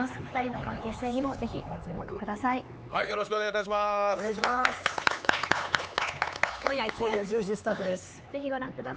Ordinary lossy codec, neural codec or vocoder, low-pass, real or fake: none; codec, 16 kHz, 4 kbps, X-Codec, HuBERT features, trained on LibriSpeech; none; fake